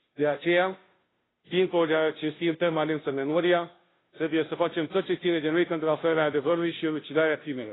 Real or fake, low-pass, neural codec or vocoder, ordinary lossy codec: fake; 7.2 kHz; codec, 16 kHz, 0.5 kbps, FunCodec, trained on Chinese and English, 25 frames a second; AAC, 16 kbps